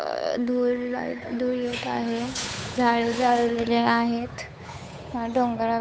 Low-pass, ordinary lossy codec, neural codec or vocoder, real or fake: none; none; codec, 16 kHz, 8 kbps, FunCodec, trained on Chinese and English, 25 frames a second; fake